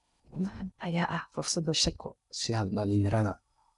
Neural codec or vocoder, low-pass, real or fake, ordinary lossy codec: codec, 16 kHz in and 24 kHz out, 0.6 kbps, FocalCodec, streaming, 2048 codes; 10.8 kHz; fake; none